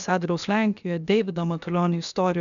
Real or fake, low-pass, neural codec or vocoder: fake; 7.2 kHz; codec, 16 kHz, about 1 kbps, DyCAST, with the encoder's durations